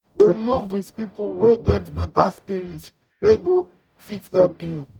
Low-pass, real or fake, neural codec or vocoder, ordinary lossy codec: 19.8 kHz; fake; codec, 44.1 kHz, 0.9 kbps, DAC; none